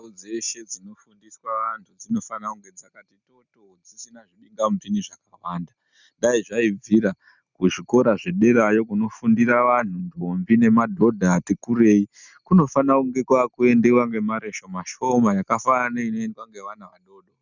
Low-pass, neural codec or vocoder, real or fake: 7.2 kHz; none; real